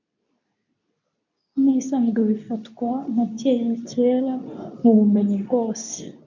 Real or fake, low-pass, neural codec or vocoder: fake; 7.2 kHz; codec, 24 kHz, 0.9 kbps, WavTokenizer, medium speech release version 2